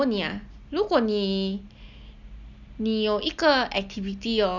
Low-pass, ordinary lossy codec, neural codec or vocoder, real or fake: 7.2 kHz; none; none; real